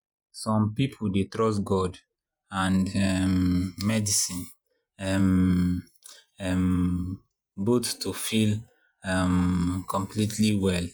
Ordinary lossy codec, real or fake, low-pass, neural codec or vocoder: none; fake; none; vocoder, 48 kHz, 128 mel bands, Vocos